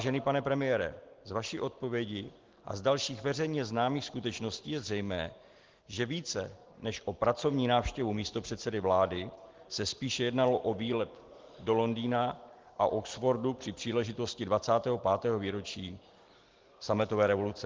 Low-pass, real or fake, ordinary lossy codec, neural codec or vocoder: 7.2 kHz; real; Opus, 16 kbps; none